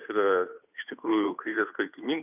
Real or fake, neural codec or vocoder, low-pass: fake; codec, 16 kHz, 2 kbps, FunCodec, trained on Chinese and English, 25 frames a second; 3.6 kHz